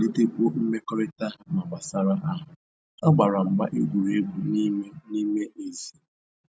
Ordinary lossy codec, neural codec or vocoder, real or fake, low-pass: none; none; real; none